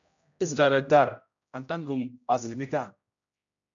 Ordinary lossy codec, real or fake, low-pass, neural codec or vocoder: MP3, 64 kbps; fake; 7.2 kHz; codec, 16 kHz, 0.5 kbps, X-Codec, HuBERT features, trained on general audio